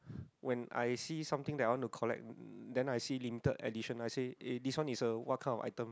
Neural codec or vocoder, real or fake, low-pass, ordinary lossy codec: none; real; none; none